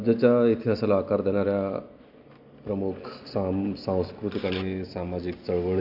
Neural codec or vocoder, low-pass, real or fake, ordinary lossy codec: none; 5.4 kHz; real; AAC, 48 kbps